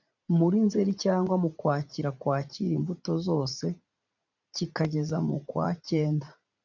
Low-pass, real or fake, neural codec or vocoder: 7.2 kHz; fake; vocoder, 44.1 kHz, 80 mel bands, Vocos